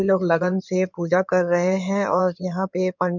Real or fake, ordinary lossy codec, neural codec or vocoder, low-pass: fake; none; codec, 16 kHz in and 24 kHz out, 2.2 kbps, FireRedTTS-2 codec; 7.2 kHz